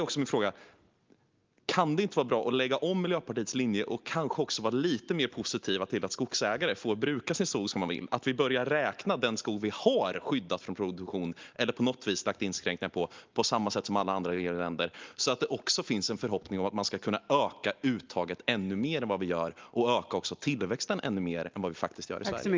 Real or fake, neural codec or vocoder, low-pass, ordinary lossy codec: real; none; 7.2 kHz; Opus, 32 kbps